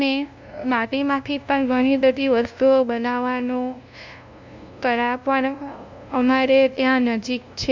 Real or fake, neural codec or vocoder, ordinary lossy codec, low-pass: fake; codec, 16 kHz, 0.5 kbps, FunCodec, trained on LibriTTS, 25 frames a second; MP3, 64 kbps; 7.2 kHz